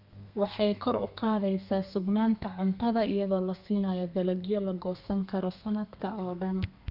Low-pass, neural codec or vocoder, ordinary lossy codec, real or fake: 5.4 kHz; codec, 32 kHz, 1.9 kbps, SNAC; none; fake